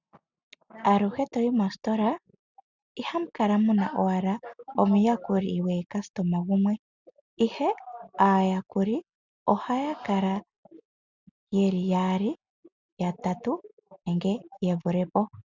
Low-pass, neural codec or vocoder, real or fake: 7.2 kHz; none; real